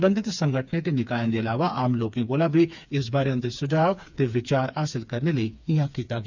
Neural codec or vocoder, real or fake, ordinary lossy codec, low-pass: codec, 16 kHz, 4 kbps, FreqCodec, smaller model; fake; none; 7.2 kHz